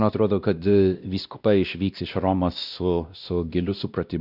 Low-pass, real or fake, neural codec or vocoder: 5.4 kHz; fake; codec, 16 kHz, 1 kbps, X-Codec, WavLM features, trained on Multilingual LibriSpeech